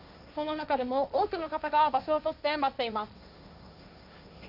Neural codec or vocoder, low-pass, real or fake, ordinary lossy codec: codec, 16 kHz, 1.1 kbps, Voila-Tokenizer; 5.4 kHz; fake; none